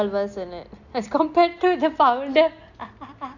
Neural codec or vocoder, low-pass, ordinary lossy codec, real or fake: none; 7.2 kHz; Opus, 64 kbps; real